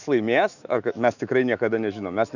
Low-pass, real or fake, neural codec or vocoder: 7.2 kHz; fake; autoencoder, 48 kHz, 128 numbers a frame, DAC-VAE, trained on Japanese speech